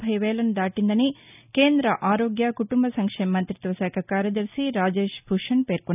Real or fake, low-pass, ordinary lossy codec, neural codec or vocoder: real; 3.6 kHz; none; none